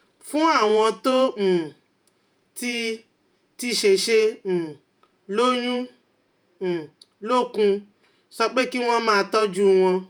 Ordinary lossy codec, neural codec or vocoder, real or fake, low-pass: none; vocoder, 48 kHz, 128 mel bands, Vocos; fake; none